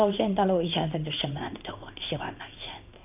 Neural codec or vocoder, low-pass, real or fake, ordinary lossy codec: codec, 16 kHz in and 24 kHz out, 1 kbps, XY-Tokenizer; 3.6 kHz; fake; none